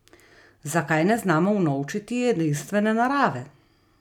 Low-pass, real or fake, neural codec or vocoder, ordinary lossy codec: 19.8 kHz; real; none; none